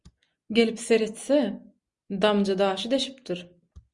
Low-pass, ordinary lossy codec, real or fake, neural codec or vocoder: 10.8 kHz; Opus, 64 kbps; real; none